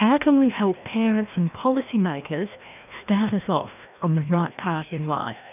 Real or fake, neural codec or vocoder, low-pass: fake; codec, 16 kHz, 1 kbps, FreqCodec, larger model; 3.6 kHz